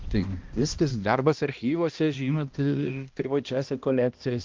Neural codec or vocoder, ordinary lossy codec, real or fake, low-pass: codec, 16 kHz, 1 kbps, X-Codec, HuBERT features, trained on balanced general audio; Opus, 32 kbps; fake; 7.2 kHz